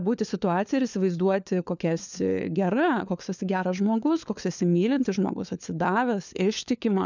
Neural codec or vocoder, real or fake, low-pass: codec, 16 kHz, 4 kbps, FunCodec, trained on LibriTTS, 50 frames a second; fake; 7.2 kHz